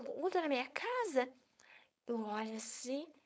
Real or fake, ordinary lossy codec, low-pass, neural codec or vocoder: fake; none; none; codec, 16 kHz, 4.8 kbps, FACodec